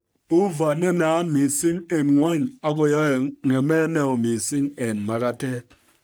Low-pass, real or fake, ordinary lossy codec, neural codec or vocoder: none; fake; none; codec, 44.1 kHz, 3.4 kbps, Pupu-Codec